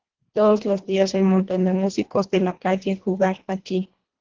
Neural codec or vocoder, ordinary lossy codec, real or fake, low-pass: codec, 24 kHz, 1.5 kbps, HILCodec; Opus, 16 kbps; fake; 7.2 kHz